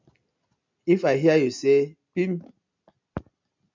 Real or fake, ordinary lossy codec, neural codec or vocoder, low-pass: real; MP3, 64 kbps; none; 7.2 kHz